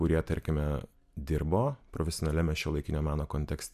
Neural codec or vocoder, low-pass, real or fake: none; 14.4 kHz; real